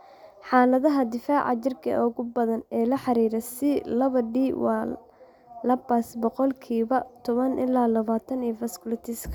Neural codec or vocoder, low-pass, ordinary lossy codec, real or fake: none; 19.8 kHz; none; real